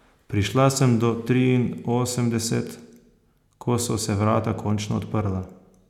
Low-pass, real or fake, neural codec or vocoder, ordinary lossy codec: 19.8 kHz; real; none; none